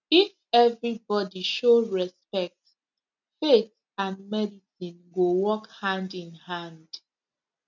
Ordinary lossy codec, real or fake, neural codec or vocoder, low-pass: none; real; none; 7.2 kHz